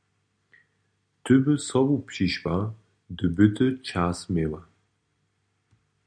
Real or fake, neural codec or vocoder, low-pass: real; none; 9.9 kHz